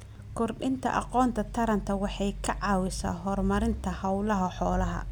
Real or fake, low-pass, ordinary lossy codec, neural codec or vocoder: real; none; none; none